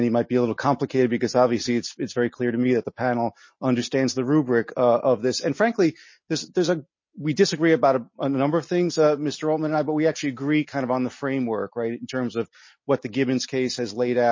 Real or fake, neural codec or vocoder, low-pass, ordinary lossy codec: real; none; 7.2 kHz; MP3, 32 kbps